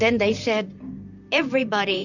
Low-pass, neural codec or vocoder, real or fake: 7.2 kHz; vocoder, 44.1 kHz, 128 mel bands, Pupu-Vocoder; fake